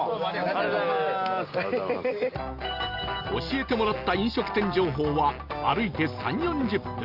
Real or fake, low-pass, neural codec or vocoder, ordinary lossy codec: real; 5.4 kHz; none; Opus, 32 kbps